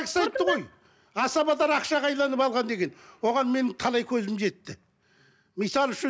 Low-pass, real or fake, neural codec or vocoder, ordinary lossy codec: none; real; none; none